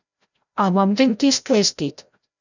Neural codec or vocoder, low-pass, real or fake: codec, 16 kHz, 0.5 kbps, FreqCodec, larger model; 7.2 kHz; fake